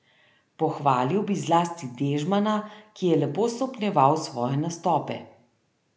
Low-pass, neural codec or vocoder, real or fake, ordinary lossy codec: none; none; real; none